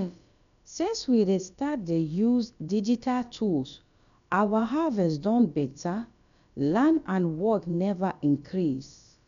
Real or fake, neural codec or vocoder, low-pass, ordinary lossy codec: fake; codec, 16 kHz, about 1 kbps, DyCAST, with the encoder's durations; 7.2 kHz; none